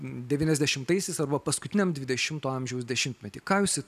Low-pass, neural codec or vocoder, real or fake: 14.4 kHz; none; real